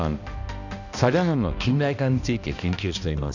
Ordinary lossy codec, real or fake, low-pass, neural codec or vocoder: none; fake; 7.2 kHz; codec, 16 kHz, 1 kbps, X-Codec, HuBERT features, trained on balanced general audio